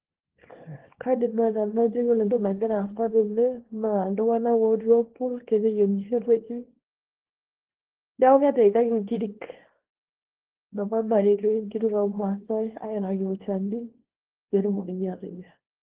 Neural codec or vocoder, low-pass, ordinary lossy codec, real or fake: codec, 24 kHz, 0.9 kbps, WavTokenizer, small release; 3.6 kHz; Opus, 16 kbps; fake